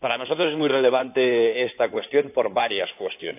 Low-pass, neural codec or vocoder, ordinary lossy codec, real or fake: 3.6 kHz; codec, 16 kHz in and 24 kHz out, 2.2 kbps, FireRedTTS-2 codec; AAC, 32 kbps; fake